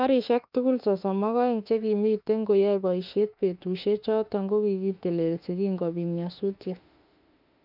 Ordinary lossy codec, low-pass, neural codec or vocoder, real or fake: none; 5.4 kHz; autoencoder, 48 kHz, 32 numbers a frame, DAC-VAE, trained on Japanese speech; fake